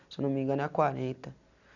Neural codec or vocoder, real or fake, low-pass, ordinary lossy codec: none; real; 7.2 kHz; none